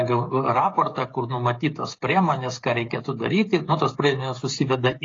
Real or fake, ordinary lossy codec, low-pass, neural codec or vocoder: fake; AAC, 32 kbps; 7.2 kHz; codec, 16 kHz, 16 kbps, FreqCodec, smaller model